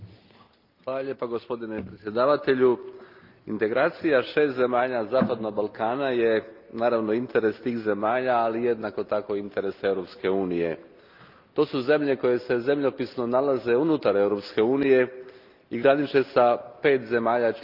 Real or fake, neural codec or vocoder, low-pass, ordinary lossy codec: real; none; 5.4 kHz; Opus, 24 kbps